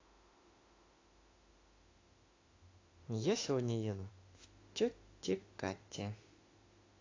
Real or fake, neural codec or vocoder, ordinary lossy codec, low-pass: fake; autoencoder, 48 kHz, 32 numbers a frame, DAC-VAE, trained on Japanese speech; AAC, 32 kbps; 7.2 kHz